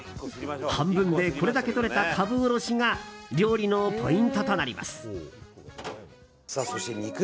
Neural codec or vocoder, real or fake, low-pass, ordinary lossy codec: none; real; none; none